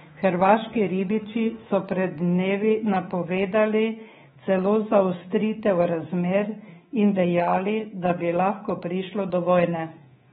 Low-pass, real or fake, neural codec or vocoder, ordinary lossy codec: 10.8 kHz; fake; codec, 24 kHz, 3.1 kbps, DualCodec; AAC, 16 kbps